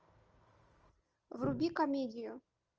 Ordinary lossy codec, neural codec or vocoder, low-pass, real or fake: Opus, 24 kbps; none; 7.2 kHz; real